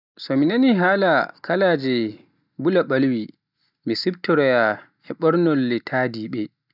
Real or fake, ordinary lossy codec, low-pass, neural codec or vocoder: real; none; 5.4 kHz; none